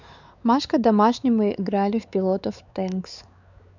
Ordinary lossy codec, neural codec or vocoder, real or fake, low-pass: none; codec, 16 kHz, 4 kbps, X-Codec, WavLM features, trained on Multilingual LibriSpeech; fake; 7.2 kHz